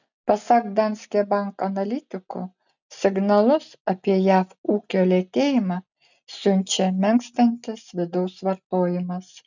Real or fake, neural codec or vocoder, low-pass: real; none; 7.2 kHz